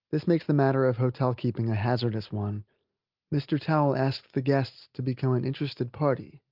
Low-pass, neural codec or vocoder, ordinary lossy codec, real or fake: 5.4 kHz; none; Opus, 32 kbps; real